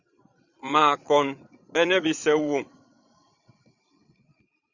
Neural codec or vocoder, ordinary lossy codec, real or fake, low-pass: vocoder, 22.05 kHz, 80 mel bands, Vocos; Opus, 64 kbps; fake; 7.2 kHz